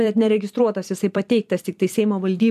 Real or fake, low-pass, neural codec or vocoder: fake; 14.4 kHz; vocoder, 44.1 kHz, 128 mel bands every 256 samples, BigVGAN v2